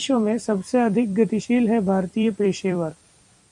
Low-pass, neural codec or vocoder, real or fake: 10.8 kHz; vocoder, 44.1 kHz, 128 mel bands every 256 samples, BigVGAN v2; fake